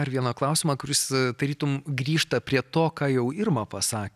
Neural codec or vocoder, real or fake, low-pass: none; real; 14.4 kHz